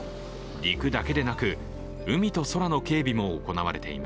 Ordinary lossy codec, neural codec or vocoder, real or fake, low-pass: none; none; real; none